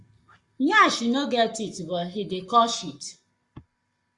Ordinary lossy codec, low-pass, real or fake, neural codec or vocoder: Opus, 64 kbps; 10.8 kHz; fake; codec, 44.1 kHz, 7.8 kbps, Pupu-Codec